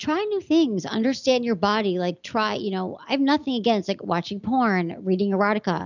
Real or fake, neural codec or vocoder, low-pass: real; none; 7.2 kHz